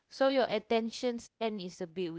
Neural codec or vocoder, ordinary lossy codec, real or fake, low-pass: codec, 16 kHz, 0.8 kbps, ZipCodec; none; fake; none